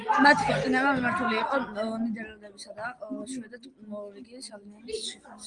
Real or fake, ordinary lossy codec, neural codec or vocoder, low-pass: real; Opus, 24 kbps; none; 9.9 kHz